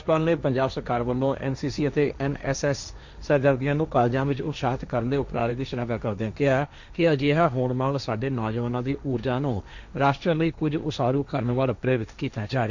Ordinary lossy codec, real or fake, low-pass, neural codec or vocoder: none; fake; 7.2 kHz; codec, 16 kHz, 1.1 kbps, Voila-Tokenizer